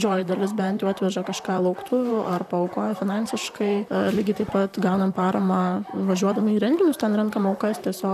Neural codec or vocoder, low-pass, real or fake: vocoder, 44.1 kHz, 128 mel bands, Pupu-Vocoder; 14.4 kHz; fake